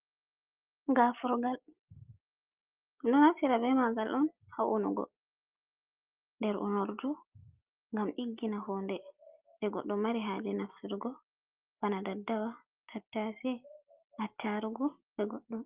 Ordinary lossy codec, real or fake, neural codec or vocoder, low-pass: Opus, 24 kbps; real; none; 3.6 kHz